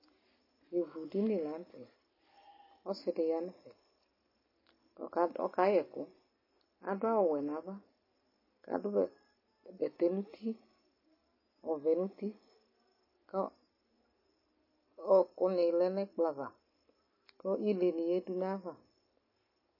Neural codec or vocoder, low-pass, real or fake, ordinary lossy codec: none; 5.4 kHz; real; MP3, 24 kbps